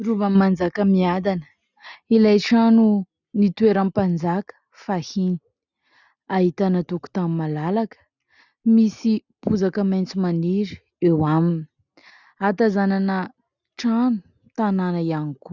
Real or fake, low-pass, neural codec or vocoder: real; 7.2 kHz; none